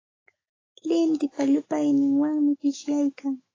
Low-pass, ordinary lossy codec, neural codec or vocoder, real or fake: 7.2 kHz; AAC, 32 kbps; autoencoder, 48 kHz, 128 numbers a frame, DAC-VAE, trained on Japanese speech; fake